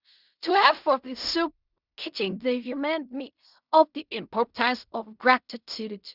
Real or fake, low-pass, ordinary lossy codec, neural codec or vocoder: fake; 5.4 kHz; none; codec, 16 kHz in and 24 kHz out, 0.4 kbps, LongCat-Audio-Codec, fine tuned four codebook decoder